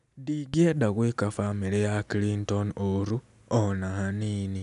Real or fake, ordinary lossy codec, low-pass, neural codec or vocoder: real; none; 10.8 kHz; none